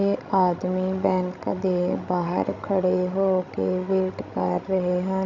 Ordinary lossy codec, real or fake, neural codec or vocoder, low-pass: none; fake; codec, 16 kHz, 16 kbps, FreqCodec, larger model; 7.2 kHz